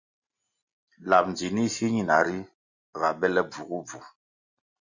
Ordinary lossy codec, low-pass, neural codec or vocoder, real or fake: Opus, 64 kbps; 7.2 kHz; none; real